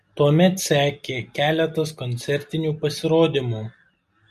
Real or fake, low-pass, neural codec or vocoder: real; 14.4 kHz; none